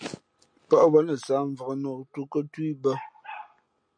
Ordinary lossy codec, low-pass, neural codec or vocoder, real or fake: MP3, 48 kbps; 9.9 kHz; none; real